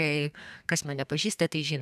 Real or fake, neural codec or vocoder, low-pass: fake; codec, 32 kHz, 1.9 kbps, SNAC; 14.4 kHz